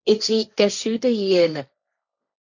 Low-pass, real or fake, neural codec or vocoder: 7.2 kHz; fake; codec, 16 kHz, 1.1 kbps, Voila-Tokenizer